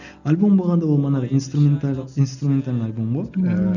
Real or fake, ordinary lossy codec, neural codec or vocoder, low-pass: real; none; none; 7.2 kHz